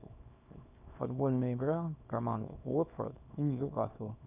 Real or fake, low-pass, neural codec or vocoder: fake; 3.6 kHz; codec, 24 kHz, 0.9 kbps, WavTokenizer, small release